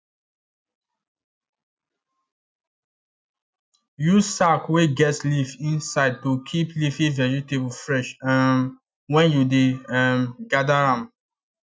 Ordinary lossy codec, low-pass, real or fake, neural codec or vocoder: none; none; real; none